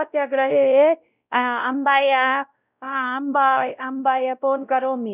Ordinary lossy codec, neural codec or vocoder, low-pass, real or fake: none; codec, 16 kHz, 0.5 kbps, X-Codec, WavLM features, trained on Multilingual LibriSpeech; 3.6 kHz; fake